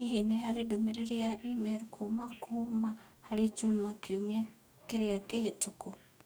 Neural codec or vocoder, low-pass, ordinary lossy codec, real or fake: codec, 44.1 kHz, 2.6 kbps, DAC; none; none; fake